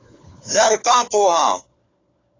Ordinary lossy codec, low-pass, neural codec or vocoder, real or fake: AAC, 32 kbps; 7.2 kHz; codec, 16 kHz, 4 kbps, FunCodec, trained on LibriTTS, 50 frames a second; fake